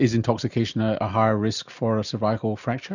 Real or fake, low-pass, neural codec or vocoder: real; 7.2 kHz; none